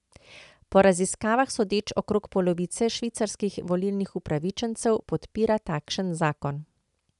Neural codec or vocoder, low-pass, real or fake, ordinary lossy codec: none; 10.8 kHz; real; none